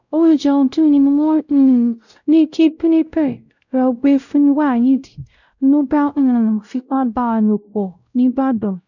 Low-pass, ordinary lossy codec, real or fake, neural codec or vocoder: 7.2 kHz; none; fake; codec, 16 kHz, 0.5 kbps, X-Codec, WavLM features, trained on Multilingual LibriSpeech